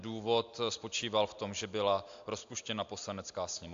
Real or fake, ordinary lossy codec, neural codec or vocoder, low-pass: real; AAC, 64 kbps; none; 7.2 kHz